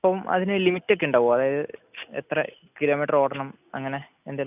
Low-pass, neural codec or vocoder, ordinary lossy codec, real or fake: 3.6 kHz; none; none; real